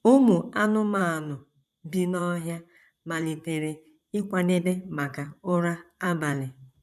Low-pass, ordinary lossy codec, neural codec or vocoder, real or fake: 14.4 kHz; none; vocoder, 44.1 kHz, 128 mel bands, Pupu-Vocoder; fake